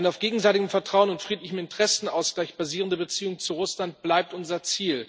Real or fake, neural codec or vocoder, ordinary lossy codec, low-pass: real; none; none; none